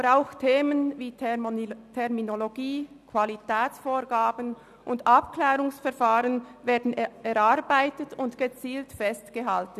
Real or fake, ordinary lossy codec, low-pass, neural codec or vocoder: real; none; 14.4 kHz; none